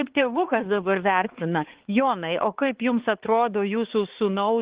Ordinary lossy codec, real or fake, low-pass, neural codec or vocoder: Opus, 16 kbps; fake; 3.6 kHz; codec, 16 kHz, 4 kbps, X-Codec, HuBERT features, trained on balanced general audio